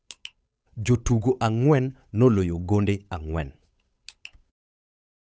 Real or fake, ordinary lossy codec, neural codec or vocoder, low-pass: fake; none; codec, 16 kHz, 8 kbps, FunCodec, trained on Chinese and English, 25 frames a second; none